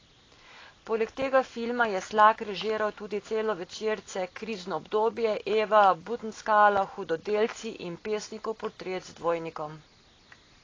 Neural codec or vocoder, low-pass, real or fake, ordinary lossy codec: none; 7.2 kHz; real; AAC, 32 kbps